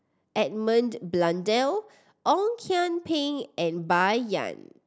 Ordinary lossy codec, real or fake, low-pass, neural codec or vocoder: none; real; none; none